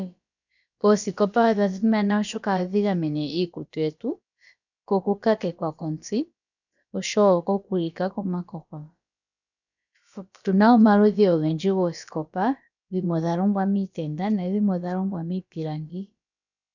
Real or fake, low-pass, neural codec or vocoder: fake; 7.2 kHz; codec, 16 kHz, about 1 kbps, DyCAST, with the encoder's durations